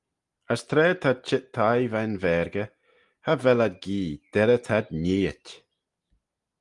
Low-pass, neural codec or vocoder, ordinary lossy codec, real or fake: 10.8 kHz; none; Opus, 32 kbps; real